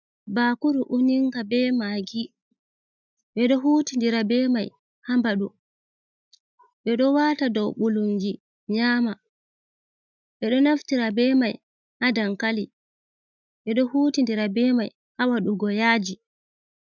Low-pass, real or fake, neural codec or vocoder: 7.2 kHz; real; none